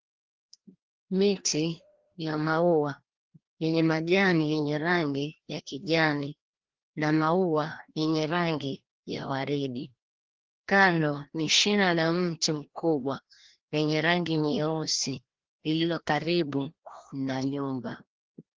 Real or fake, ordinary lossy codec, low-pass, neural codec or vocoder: fake; Opus, 16 kbps; 7.2 kHz; codec, 16 kHz, 1 kbps, FreqCodec, larger model